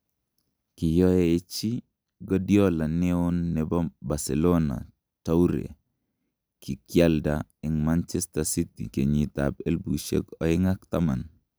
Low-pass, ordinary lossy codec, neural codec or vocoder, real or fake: none; none; none; real